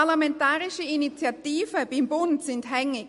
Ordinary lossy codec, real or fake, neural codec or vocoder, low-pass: MP3, 48 kbps; real; none; 14.4 kHz